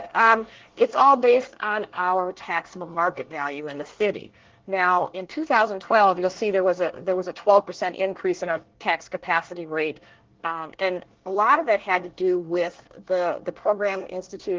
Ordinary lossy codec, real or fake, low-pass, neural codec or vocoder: Opus, 16 kbps; fake; 7.2 kHz; codec, 24 kHz, 1 kbps, SNAC